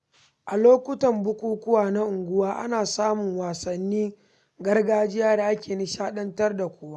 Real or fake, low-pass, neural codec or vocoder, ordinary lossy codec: real; none; none; none